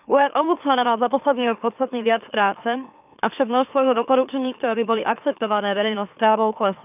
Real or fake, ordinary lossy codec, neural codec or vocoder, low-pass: fake; none; autoencoder, 44.1 kHz, a latent of 192 numbers a frame, MeloTTS; 3.6 kHz